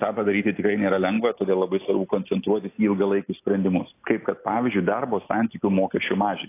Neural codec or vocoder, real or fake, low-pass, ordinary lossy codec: none; real; 3.6 kHz; AAC, 24 kbps